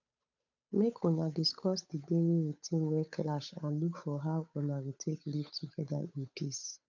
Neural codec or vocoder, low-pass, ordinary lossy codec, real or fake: codec, 16 kHz, 8 kbps, FunCodec, trained on Chinese and English, 25 frames a second; 7.2 kHz; none; fake